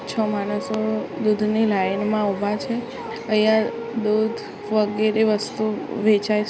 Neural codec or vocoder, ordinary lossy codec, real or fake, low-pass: none; none; real; none